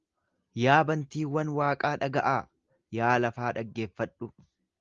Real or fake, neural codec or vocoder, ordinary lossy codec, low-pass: real; none; Opus, 32 kbps; 7.2 kHz